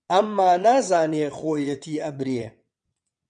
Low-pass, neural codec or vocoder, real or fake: 9.9 kHz; vocoder, 22.05 kHz, 80 mel bands, WaveNeXt; fake